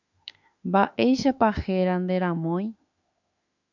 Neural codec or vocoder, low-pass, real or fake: autoencoder, 48 kHz, 32 numbers a frame, DAC-VAE, trained on Japanese speech; 7.2 kHz; fake